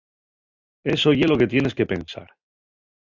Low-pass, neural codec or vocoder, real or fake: 7.2 kHz; none; real